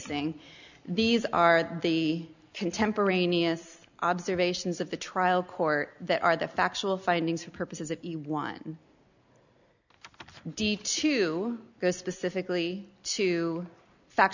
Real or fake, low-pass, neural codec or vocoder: real; 7.2 kHz; none